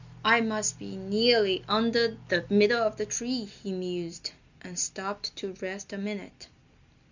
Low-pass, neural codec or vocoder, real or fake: 7.2 kHz; none; real